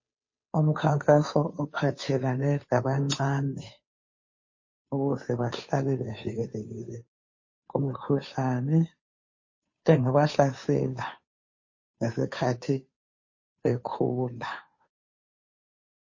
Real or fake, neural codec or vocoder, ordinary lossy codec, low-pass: fake; codec, 16 kHz, 2 kbps, FunCodec, trained on Chinese and English, 25 frames a second; MP3, 32 kbps; 7.2 kHz